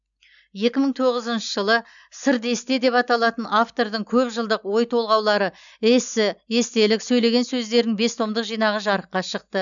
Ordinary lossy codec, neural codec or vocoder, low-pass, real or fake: none; none; 7.2 kHz; real